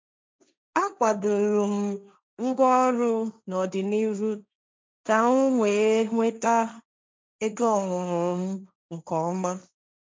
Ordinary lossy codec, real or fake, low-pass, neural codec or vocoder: none; fake; none; codec, 16 kHz, 1.1 kbps, Voila-Tokenizer